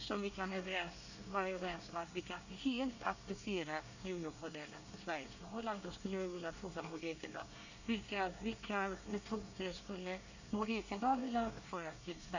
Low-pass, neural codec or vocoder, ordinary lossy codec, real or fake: 7.2 kHz; codec, 24 kHz, 1 kbps, SNAC; none; fake